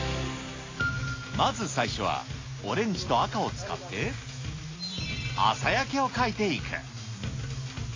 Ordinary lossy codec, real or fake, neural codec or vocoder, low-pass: AAC, 32 kbps; real; none; 7.2 kHz